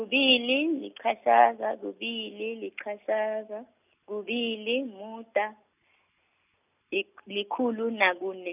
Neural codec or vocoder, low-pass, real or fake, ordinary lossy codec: none; 3.6 kHz; real; none